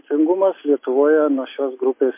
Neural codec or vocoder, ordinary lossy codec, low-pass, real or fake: none; MP3, 24 kbps; 3.6 kHz; real